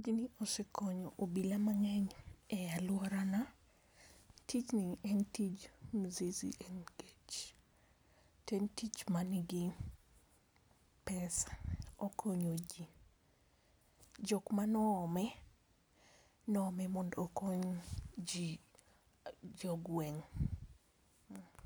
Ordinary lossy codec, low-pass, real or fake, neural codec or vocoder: none; none; real; none